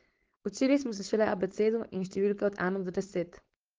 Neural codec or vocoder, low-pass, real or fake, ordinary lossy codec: codec, 16 kHz, 4.8 kbps, FACodec; 7.2 kHz; fake; Opus, 16 kbps